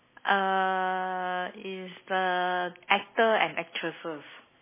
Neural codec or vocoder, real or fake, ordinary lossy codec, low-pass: none; real; MP3, 16 kbps; 3.6 kHz